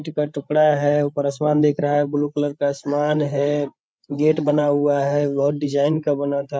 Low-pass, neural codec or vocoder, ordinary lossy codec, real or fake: none; codec, 16 kHz, 8 kbps, FreqCodec, larger model; none; fake